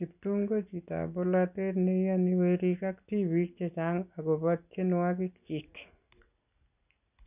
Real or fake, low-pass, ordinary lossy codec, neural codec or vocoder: real; 3.6 kHz; none; none